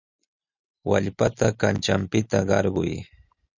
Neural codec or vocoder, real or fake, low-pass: none; real; 7.2 kHz